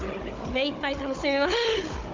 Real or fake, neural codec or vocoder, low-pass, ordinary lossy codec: fake; codec, 16 kHz, 16 kbps, FunCodec, trained on Chinese and English, 50 frames a second; 7.2 kHz; Opus, 24 kbps